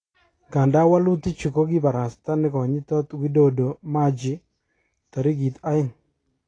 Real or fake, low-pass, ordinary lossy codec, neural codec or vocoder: real; 9.9 kHz; AAC, 32 kbps; none